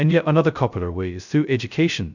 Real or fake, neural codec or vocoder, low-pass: fake; codec, 16 kHz, 0.2 kbps, FocalCodec; 7.2 kHz